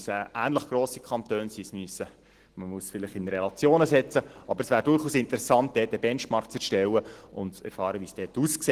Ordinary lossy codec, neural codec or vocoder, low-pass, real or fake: Opus, 16 kbps; none; 14.4 kHz; real